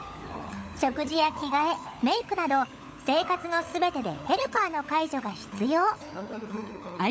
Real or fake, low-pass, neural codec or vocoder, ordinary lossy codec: fake; none; codec, 16 kHz, 16 kbps, FunCodec, trained on LibriTTS, 50 frames a second; none